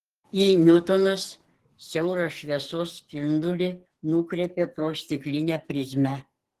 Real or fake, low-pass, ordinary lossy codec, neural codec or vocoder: fake; 14.4 kHz; Opus, 16 kbps; codec, 44.1 kHz, 2.6 kbps, SNAC